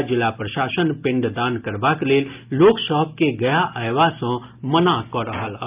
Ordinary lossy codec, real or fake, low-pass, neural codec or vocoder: Opus, 24 kbps; real; 3.6 kHz; none